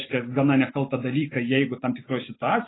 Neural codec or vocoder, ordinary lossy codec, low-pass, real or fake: none; AAC, 16 kbps; 7.2 kHz; real